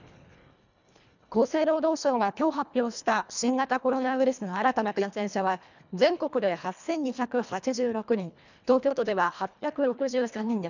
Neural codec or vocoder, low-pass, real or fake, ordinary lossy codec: codec, 24 kHz, 1.5 kbps, HILCodec; 7.2 kHz; fake; none